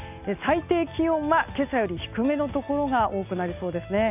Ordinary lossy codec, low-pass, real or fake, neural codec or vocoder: none; 3.6 kHz; real; none